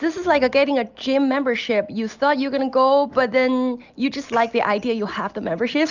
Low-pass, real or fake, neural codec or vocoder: 7.2 kHz; real; none